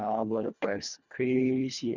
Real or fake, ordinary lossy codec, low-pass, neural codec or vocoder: fake; none; 7.2 kHz; codec, 24 kHz, 1.5 kbps, HILCodec